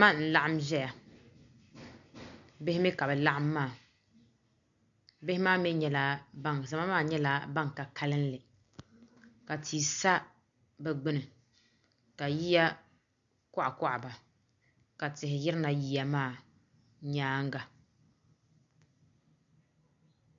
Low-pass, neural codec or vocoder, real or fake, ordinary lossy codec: 7.2 kHz; none; real; MP3, 96 kbps